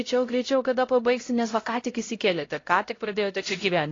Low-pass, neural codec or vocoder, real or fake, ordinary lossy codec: 7.2 kHz; codec, 16 kHz, 0.5 kbps, X-Codec, HuBERT features, trained on LibriSpeech; fake; AAC, 32 kbps